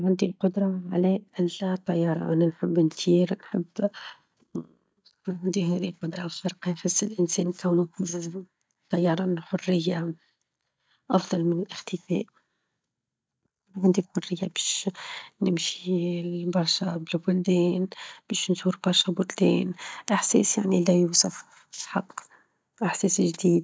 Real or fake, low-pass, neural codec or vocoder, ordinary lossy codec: real; none; none; none